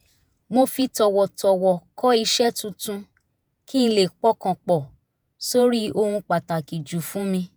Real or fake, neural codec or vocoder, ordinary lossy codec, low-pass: fake; vocoder, 48 kHz, 128 mel bands, Vocos; none; none